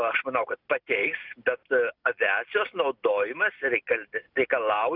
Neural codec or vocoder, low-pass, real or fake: none; 5.4 kHz; real